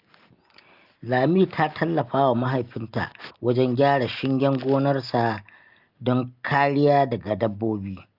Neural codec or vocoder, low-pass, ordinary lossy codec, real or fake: none; 5.4 kHz; Opus, 24 kbps; real